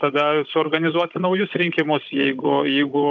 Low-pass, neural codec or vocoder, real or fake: 7.2 kHz; codec, 16 kHz, 16 kbps, FunCodec, trained on Chinese and English, 50 frames a second; fake